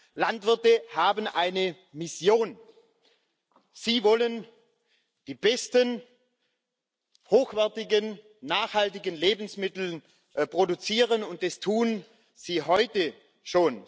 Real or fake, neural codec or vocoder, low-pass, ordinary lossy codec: real; none; none; none